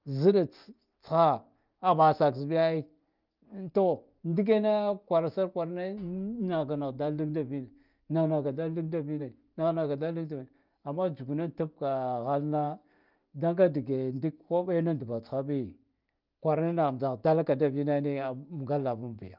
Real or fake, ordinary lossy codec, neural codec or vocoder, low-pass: real; Opus, 24 kbps; none; 5.4 kHz